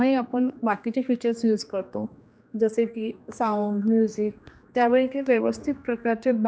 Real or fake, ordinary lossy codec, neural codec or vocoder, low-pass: fake; none; codec, 16 kHz, 2 kbps, X-Codec, HuBERT features, trained on general audio; none